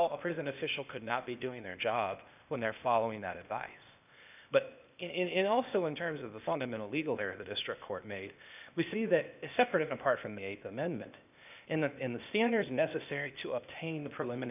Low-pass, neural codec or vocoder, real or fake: 3.6 kHz; codec, 16 kHz, 0.8 kbps, ZipCodec; fake